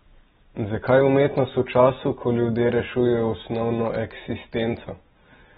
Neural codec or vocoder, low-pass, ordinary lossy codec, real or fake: none; 19.8 kHz; AAC, 16 kbps; real